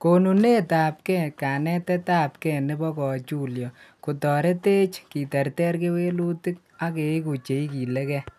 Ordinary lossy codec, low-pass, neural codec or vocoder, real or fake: none; 14.4 kHz; none; real